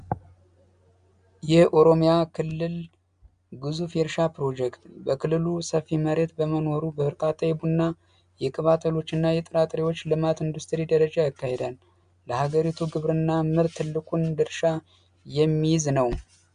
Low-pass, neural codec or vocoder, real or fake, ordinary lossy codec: 9.9 kHz; none; real; MP3, 96 kbps